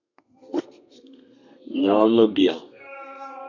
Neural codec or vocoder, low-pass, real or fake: codec, 32 kHz, 1.9 kbps, SNAC; 7.2 kHz; fake